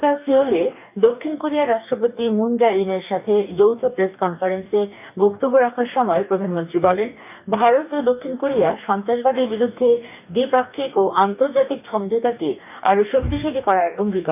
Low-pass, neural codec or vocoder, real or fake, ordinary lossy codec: 3.6 kHz; codec, 44.1 kHz, 2.6 kbps, DAC; fake; none